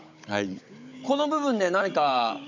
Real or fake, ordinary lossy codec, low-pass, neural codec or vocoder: fake; MP3, 64 kbps; 7.2 kHz; codec, 16 kHz, 16 kbps, FunCodec, trained on Chinese and English, 50 frames a second